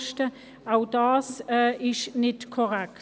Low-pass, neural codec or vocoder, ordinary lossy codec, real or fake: none; none; none; real